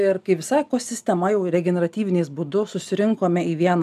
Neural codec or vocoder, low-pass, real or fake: none; 14.4 kHz; real